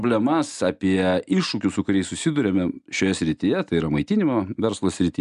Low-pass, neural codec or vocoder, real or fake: 10.8 kHz; none; real